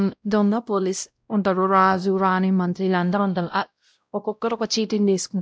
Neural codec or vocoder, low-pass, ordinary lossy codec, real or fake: codec, 16 kHz, 0.5 kbps, X-Codec, WavLM features, trained on Multilingual LibriSpeech; none; none; fake